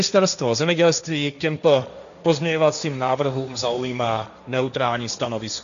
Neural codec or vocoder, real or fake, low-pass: codec, 16 kHz, 1.1 kbps, Voila-Tokenizer; fake; 7.2 kHz